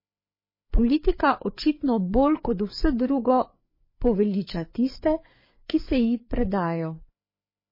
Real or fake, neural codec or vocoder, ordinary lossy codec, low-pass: fake; codec, 16 kHz, 4 kbps, FreqCodec, larger model; MP3, 24 kbps; 5.4 kHz